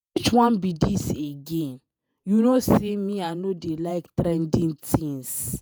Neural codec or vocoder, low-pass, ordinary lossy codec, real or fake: vocoder, 48 kHz, 128 mel bands, Vocos; none; none; fake